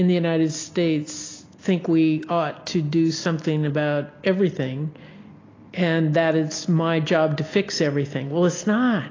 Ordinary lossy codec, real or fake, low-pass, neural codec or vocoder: AAC, 32 kbps; real; 7.2 kHz; none